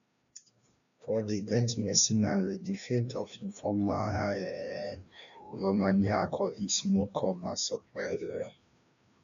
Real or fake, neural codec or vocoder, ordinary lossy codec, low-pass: fake; codec, 16 kHz, 1 kbps, FreqCodec, larger model; none; 7.2 kHz